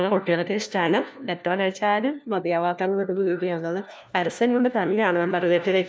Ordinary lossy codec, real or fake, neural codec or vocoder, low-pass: none; fake; codec, 16 kHz, 1 kbps, FunCodec, trained on LibriTTS, 50 frames a second; none